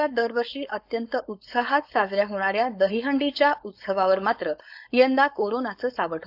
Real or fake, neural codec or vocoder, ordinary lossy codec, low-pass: fake; codec, 16 kHz, 4.8 kbps, FACodec; AAC, 48 kbps; 5.4 kHz